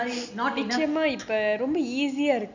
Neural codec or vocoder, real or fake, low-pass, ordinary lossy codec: none; real; 7.2 kHz; none